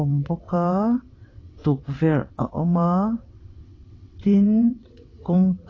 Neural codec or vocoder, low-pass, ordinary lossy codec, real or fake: vocoder, 22.05 kHz, 80 mel bands, WaveNeXt; 7.2 kHz; AAC, 32 kbps; fake